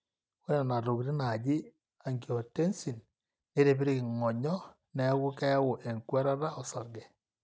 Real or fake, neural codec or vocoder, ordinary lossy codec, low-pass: real; none; none; none